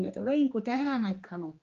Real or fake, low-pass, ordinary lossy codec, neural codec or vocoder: fake; 7.2 kHz; Opus, 32 kbps; codec, 16 kHz, 2 kbps, X-Codec, HuBERT features, trained on general audio